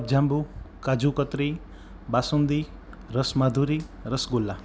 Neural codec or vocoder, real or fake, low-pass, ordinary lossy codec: none; real; none; none